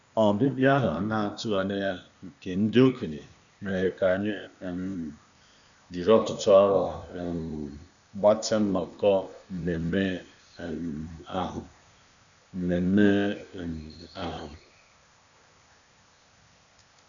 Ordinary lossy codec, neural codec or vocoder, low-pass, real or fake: none; codec, 16 kHz, 0.8 kbps, ZipCodec; 7.2 kHz; fake